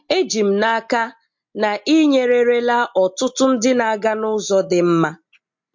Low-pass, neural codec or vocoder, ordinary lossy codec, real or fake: 7.2 kHz; none; MP3, 48 kbps; real